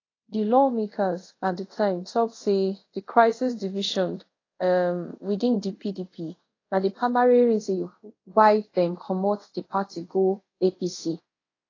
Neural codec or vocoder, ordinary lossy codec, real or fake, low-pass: codec, 24 kHz, 0.5 kbps, DualCodec; AAC, 32 kbps; fake; 7.2 kHz